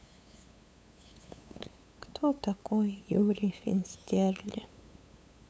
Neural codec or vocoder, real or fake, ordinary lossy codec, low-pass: codec, 16 kHz, 8 kbps, FunCodec, trained on LibriTTS, 25 frames a second; fake; none; none